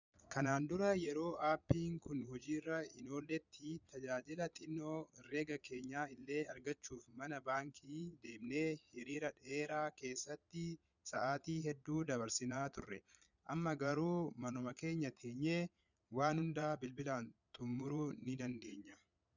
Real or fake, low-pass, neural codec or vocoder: fake; 7.2 kHz; vocoder, 22.05 kHz, 80 mel bands, Vocos